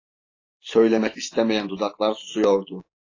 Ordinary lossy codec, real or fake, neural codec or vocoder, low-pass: AAC, 32 kbps; real; none; 7.2 kHz